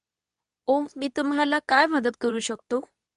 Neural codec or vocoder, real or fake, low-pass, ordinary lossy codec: codec, 24 kHz, 0.9 kbps, WavTokenizer, medium speech release version 2; fake; 10.8 kHz; none